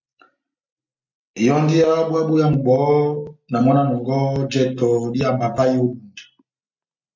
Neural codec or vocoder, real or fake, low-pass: none; real; 7.2 kHz